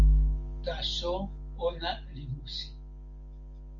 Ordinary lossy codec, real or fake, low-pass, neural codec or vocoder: Opus, 32 kbps; real; 7.2 kHz; none